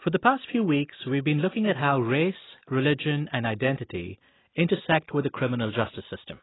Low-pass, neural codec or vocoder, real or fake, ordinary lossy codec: 7.2 kHz; none; real; AAC, 16 kbps